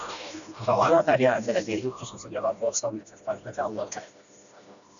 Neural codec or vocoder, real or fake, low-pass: codec, 16 kHz, 1 kbps, FreqCodec, smaller model; fake; 7.2 kHz